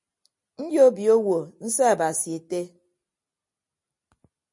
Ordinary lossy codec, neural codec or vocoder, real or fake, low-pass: MP3, 48 kbps; none; real; 10.8 kHz